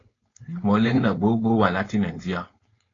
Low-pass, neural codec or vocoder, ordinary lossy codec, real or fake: 7.2 kHz; codec, 16 kHz, 4.8 kbps, FACodec; AAC, 32 kbps; fake